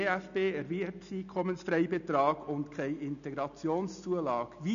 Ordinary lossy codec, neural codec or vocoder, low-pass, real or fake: none; none; 7.2 kHz; real